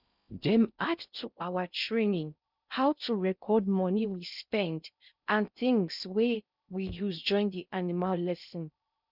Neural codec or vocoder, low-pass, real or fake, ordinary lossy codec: codec, 16 kHz in and 24 kHz out, 0.6 kbps, FocalCodec, streaming, 4096 codes; 5.4 kHz; fake; none